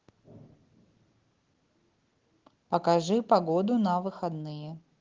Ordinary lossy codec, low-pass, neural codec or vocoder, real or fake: Opus, 16 kbps; 7.2 kHz; none; real